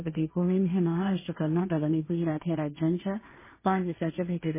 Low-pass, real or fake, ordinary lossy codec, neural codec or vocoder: 3.6 kHz; fake; MP3, 16 kbps; codec, 16 kHz, 1.1 kbps, Voila-Tokenizer